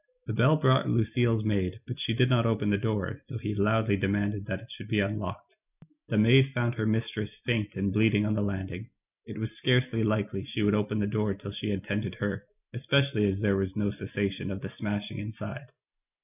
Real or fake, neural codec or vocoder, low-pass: real; none; 3.6 kHz